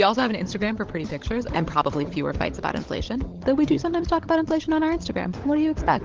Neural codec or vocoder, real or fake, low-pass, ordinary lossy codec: codec, 16 kHz, 16 kbps, FunCodec, trained on LibriTTS, 50 frames a second; fake; 7.2 kHz; Opus, 16 kbps